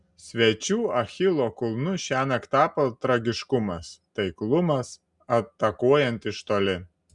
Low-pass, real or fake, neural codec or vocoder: 10.8 kHz; real; none